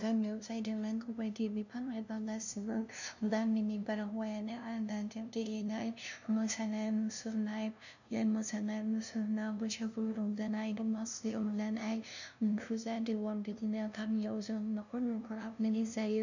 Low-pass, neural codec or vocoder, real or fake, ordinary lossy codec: 7.2 kHz; codec, 16 kHz, 0.5 kbps, FunCodec, trained on LibriTTS, 25 frames a second; fake; none